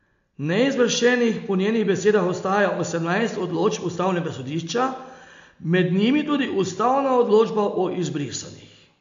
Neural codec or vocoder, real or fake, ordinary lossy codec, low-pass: none; real; MP3, 48 kbps; 7.2 kHz